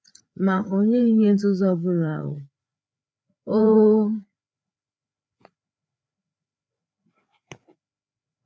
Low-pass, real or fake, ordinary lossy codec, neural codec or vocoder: none; fake; none; codec, 16 kHz, 4 kbps, FreqCodec, larger model